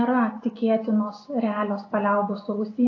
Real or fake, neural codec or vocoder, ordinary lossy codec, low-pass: real; none; AAC, 32 kbps; 7.2 kHz